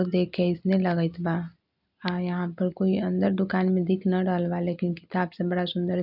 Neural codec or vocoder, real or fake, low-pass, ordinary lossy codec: none; real; 5.4 kHz; none